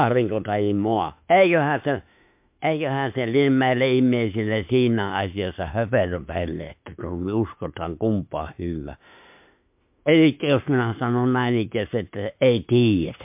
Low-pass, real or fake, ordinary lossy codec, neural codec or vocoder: 3.6 kHz; fake; MP3, 32 kbps; autoencoder, 48 kHz, 32 numbers a frame, DAC-VAE, trained on Japanese speech